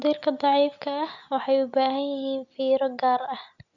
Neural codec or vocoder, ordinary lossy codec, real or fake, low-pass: none; none; real; 7.2 kHz